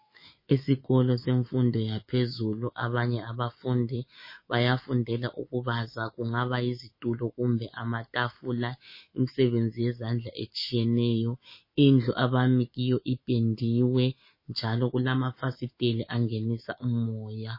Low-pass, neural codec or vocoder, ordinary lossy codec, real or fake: 5.4 kHz; autoencoder, 48 kHz, 128 numbers a frame, DAC-VAE, trained on Japanese speech; MP3, 24 kbps; fake